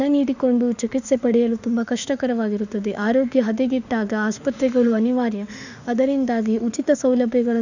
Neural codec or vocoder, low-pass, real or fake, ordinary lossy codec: autoencoder, 48 kHz, 32 numbers a frame, DAC-VAE, trained on Japanese speech; 7.2 kHz; fake; none